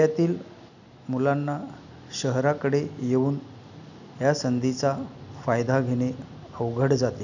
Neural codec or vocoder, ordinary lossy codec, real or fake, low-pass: none; none; real; 7.2 kHz